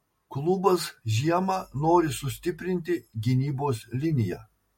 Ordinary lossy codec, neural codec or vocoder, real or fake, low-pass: MP3, 64 kbps; none; real; 19.8 kHz